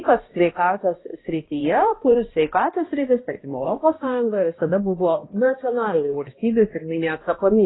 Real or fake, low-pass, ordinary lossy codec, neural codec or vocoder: fake; 7.2 kHz; AAC, 16 kbps; codec, 16 kHz, 1 kbps, X-Codec, HuBERT features, trained on balanced general audio